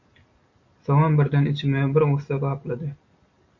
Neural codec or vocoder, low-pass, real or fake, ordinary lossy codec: none; 7.2 kHz; real; MP3, 48 kbps